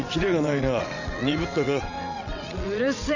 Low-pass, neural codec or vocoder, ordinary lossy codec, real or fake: 7.2 kHz; vocoder, 22.05 kHz, 80 mel bands, WaveNeXt; none; fake